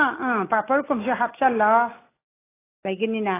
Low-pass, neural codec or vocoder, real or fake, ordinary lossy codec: 3.6 kHz; none; real; AAC, 16 kbps